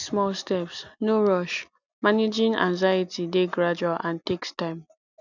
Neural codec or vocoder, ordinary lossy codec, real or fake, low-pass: none; none; real; 7.2 kHz